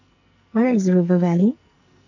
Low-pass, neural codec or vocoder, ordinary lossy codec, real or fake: 7.2 kHz; codec, 44.1 kHz, 2.6 kbps, SNAC; none; fake